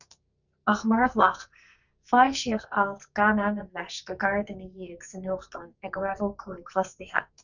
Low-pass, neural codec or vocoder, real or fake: 7.2 kHz; codec, 44.1 kHz, 2.6 kbps, SNAC; fake